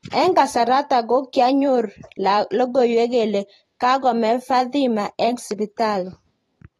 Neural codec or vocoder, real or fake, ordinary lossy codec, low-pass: vocoder, 44.1 kHz, 128 mel bands every 512 samples, BigVGAN v2; fake; AAC, 32 kbps; 19.8 kHz